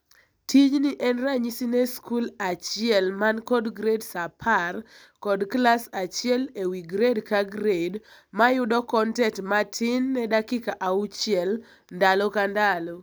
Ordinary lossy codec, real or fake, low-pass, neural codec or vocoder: none; real; none; none